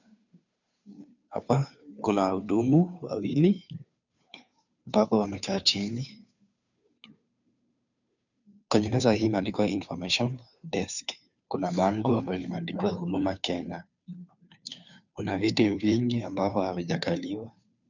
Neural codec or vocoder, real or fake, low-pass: codec, 16 kHz, 2 kbps, FunCodec, trained on Chinese and English, 25 frames a second; fake; 7.2 kHz